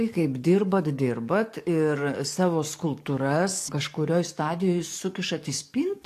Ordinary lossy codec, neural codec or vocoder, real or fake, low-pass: AAC, 64 kbps; codec, 44.1 kHz, 7.8 kbps, DAC; fake; 14.4 kHz